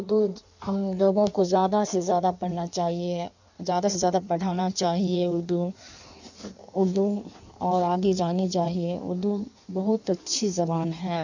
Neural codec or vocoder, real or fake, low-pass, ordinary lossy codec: codec, 16 kHz in and 24 kHz out, 1.1 kbps, FireRedTTS-2 codec; fake; 7.2 kHz; none